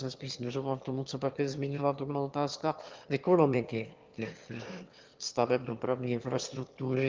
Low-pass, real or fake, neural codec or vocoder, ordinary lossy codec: 7.2 kHz; fake; autoencoder, 22.05 kHz, a latent of 192 numbers a frame, VITS, trained on one speaker; Opus, 32 kbps